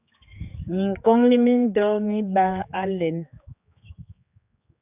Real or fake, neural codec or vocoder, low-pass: fake; codec, 16 kHz, 4 kbps, X-Codec, HuBERT features, trained on general audio; 3.6 kHz